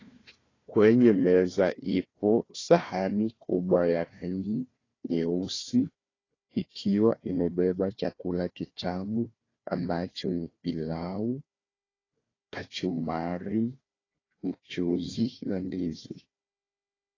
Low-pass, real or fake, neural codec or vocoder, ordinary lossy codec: 7.2 kHz; fake; codec, 16 kHz, 1 kbps, FunCodec, trained on Chinese and English, 50 frames a second; AAC, 32 kbps